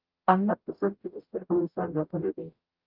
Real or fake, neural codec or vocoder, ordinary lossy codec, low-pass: fake; codec, 44.1 kHz, 0.9 kbps, DAC; Opus, 24 kbps; 5.4 kHz